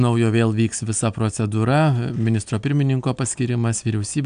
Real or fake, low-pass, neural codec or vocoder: real; 9.9 kHz; none